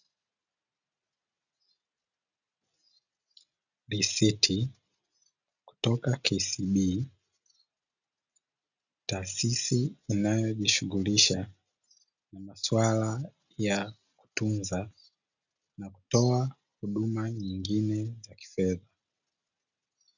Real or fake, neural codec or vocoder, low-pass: real; none; 7.2 kHz